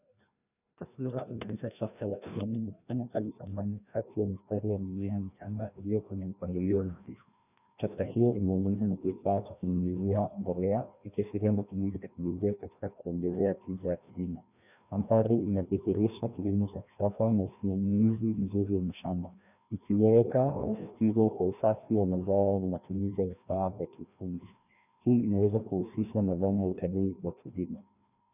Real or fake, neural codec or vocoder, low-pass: fake; codec, 16 kHz, 1 kbps, FreqCodec, larger model; 3.6 kHz